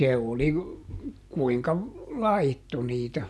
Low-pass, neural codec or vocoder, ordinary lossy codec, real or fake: none; none; none; real